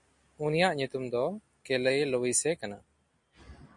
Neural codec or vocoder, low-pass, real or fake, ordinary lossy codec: none; 10.8 kHz; real; MP3, 48 kbps